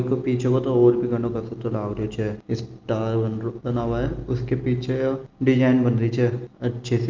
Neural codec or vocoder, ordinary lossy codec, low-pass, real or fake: none; Opus, 24 kbps; 7.2 kHz; real